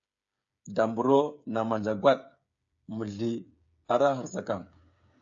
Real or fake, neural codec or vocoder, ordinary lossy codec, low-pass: fake; codec, 16 kHz, 8 kbps, FreqCodec, smaller model; AAC, 64 kbps; 7.2 kHz